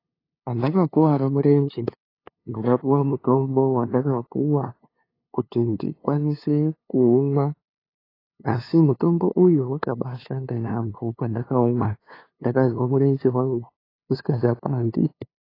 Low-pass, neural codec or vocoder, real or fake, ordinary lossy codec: 5.4 kHz; codec, 16 kHz, 2 kbps, FunCodec, trained on LibriTTS, 25 frames a second; fake; AAC, 24 kbps